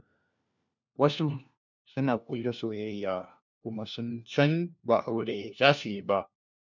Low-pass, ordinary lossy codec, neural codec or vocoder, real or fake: 7.2 kHz; none; codec, 16 kHz, 1 kbps, FunCodec, trained on LibriTTS, 50 frames a second; fake